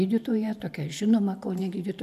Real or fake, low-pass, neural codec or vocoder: fake; 14.4 kHz; vocoder, 44.1 kHz, 128 mel bands every 512 samples, BigVGAN v2